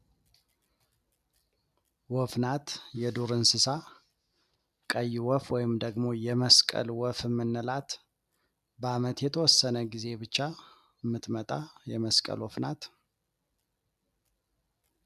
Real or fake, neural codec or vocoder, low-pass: real; none; 14.4 kHz